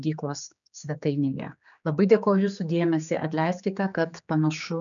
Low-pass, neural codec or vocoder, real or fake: 7.2 kHz; codec, 16 kHz, 4 kbps, X-Codec, HuBERT features, trained on general audio; fake